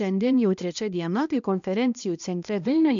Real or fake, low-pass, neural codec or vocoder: fake; 7.2 kHz; codec, 16 kHz, 1 kbps, X-Codec, HuBERT features, trained on balanced general audio